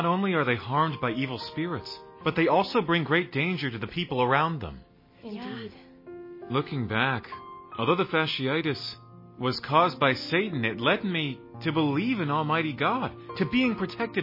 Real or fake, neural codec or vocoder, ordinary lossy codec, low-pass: real; none; MP3, 24 kbps; 5.4 kHz